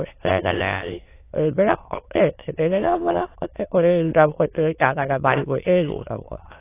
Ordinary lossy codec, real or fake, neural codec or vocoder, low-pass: AAC, 24 kbps; fake; autoencoder, 22.05 kHz, a latent of 192 numbers a frame, VITS, trained on many speakers; 3.6 kHz